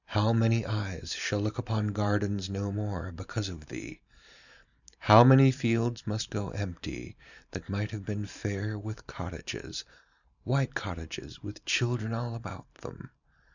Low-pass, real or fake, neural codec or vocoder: 7.2 kHz; fake; vocoder, 44.1 kHz, 128 mel bands every 512 samples, BigVGAN v2